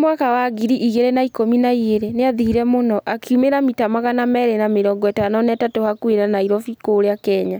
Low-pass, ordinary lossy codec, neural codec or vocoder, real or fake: none; none; none; real